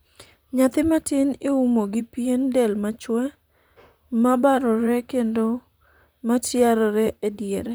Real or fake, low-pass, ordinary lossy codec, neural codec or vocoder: fake; none; none; vocoder, 44.1 kHz, 128 mel bands, Pupu-Vocoder